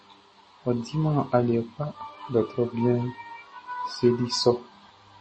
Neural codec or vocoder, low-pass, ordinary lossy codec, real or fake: none; 9.9 kHz; MP3, 32 kbps; real